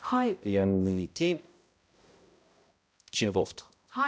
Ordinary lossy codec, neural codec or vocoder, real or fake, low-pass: none; codec, 16 kHz, 0.5 kbps, X-Codec, HuBERT features, trained on balanced general audio; fake; none